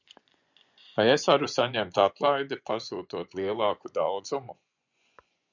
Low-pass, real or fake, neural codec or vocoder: 7.2 kHz; fake; vocoder, 24 kHz, 100 mel bands, Vocos